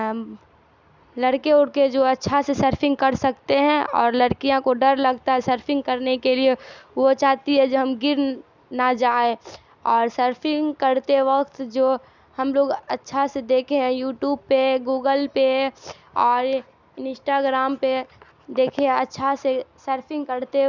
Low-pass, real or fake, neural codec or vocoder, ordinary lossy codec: 7.2 kHz; real; none; none